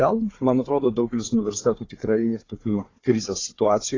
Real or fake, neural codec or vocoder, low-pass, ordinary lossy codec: fake; codec, 16 kHz, 4 kbps, FunCodec, trained on Chinese and English, 50 frames a second; 7.2 kHz; AAC, 32 kbps